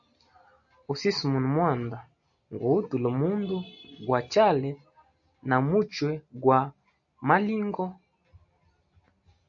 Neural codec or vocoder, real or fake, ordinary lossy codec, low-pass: none; real; AAC, 64 kbps; 7.2 kHz